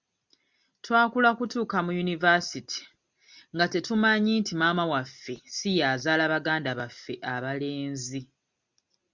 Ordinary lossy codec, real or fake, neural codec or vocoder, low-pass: Opus, 64 kbps; real; none; 7.2 kHz